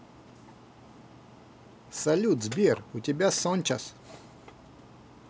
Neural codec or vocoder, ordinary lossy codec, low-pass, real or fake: none; none; none; real